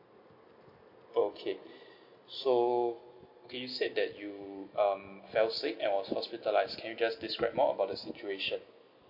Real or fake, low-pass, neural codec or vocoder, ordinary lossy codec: real; 5.4 kHz; none; MP3, 32 kbps